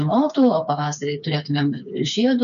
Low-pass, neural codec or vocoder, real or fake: 7.2 kHz; codec, 16 kHz, 4 kbps, FreqCodec, smaller model; fake